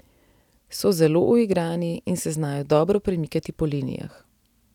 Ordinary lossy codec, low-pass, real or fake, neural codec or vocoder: none; 19.8 kHz; real; none